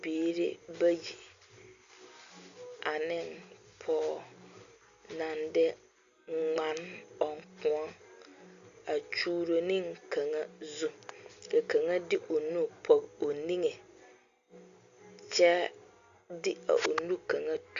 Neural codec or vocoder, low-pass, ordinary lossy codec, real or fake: none; 7.2 kHz; Opus, 64 kbps; real